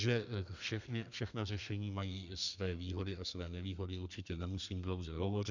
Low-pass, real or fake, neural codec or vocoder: 7.2 kHz; fake; codec, 32 kHz, 1.9 kbps, SNAC